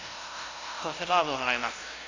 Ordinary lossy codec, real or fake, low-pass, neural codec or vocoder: AAC, 32 kbps; fake; 7.2 kHz; codec, 16 kHz, 0.5 kbps, FunCodec, trained on LibriTTS, 25 frames a second